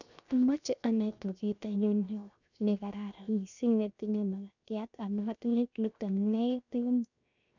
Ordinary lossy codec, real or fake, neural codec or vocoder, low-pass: none; fake; codec, 16 kHz, 0.7 kbps, FocalCodec; 7.2 kHz